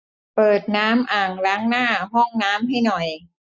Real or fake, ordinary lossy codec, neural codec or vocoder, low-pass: real; none; none; none